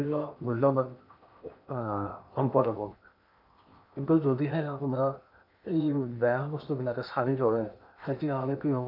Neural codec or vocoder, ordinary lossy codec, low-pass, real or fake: codec, 16 kHz in and 24 kHz out, 0.8 kbps, FocalCodec, streaming, 65536 codes; none; 5.4 kHz; fake